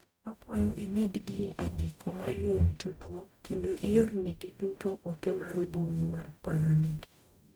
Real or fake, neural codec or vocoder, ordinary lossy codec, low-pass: fake; codec, 44.1 kHz, 0.9 kbps, DAC; none; none